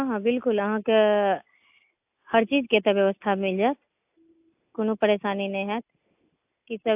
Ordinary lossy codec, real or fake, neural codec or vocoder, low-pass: AAC, 32 kbps; real; none; 3.6 kHz